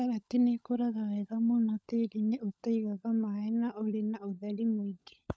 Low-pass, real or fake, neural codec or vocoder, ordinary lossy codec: none; fake; codec, 16 kHz, 16 kbps, FunCodec, trained on LibriTTS, 50 frames a second; none